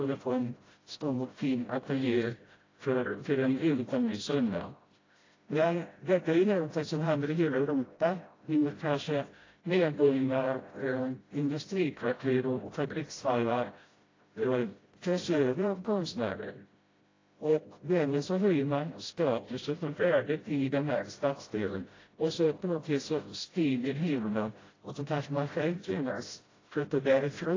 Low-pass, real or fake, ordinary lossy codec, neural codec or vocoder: 7.2 kHz; fake; AAC, 32 kbps; codec, 16 kHz, 0.5 kbps, FreqCodec, smaller model